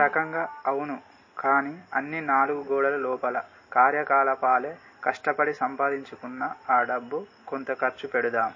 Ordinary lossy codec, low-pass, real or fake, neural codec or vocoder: MP3, 32 kbps; 7.2 kHz; real; none